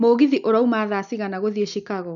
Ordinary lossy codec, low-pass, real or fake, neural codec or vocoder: none; 7.2 kHz; real; none